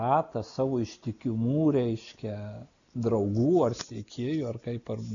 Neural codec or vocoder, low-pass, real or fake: none; 7.2 kHz; real